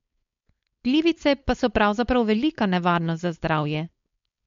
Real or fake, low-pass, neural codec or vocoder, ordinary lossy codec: fake; 7.2 kHz; codec, 16 kHz, 4.8 kbps, FACodec; MP3, 48 kbps